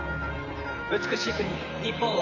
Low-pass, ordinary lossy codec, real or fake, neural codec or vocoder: 7.2 kHz; Opus, 64 kbps; fake; vocoder, 44.1 kHz, 128 mel bands, Pupu-Vocoder